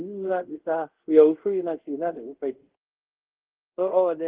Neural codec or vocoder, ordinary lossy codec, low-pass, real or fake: codec, 24 kHz, 0.5 kbps, DualCodec; Opus, 16 kbps; 3.6 kHz; fake